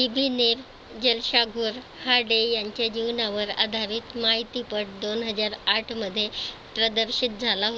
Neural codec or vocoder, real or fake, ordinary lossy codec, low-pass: none; real; none; none